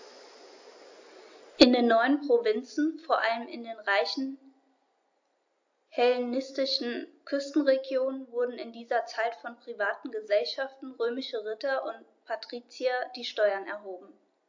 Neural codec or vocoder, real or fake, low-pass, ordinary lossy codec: none; real; 7.2 kHz; AAC, 48 kbps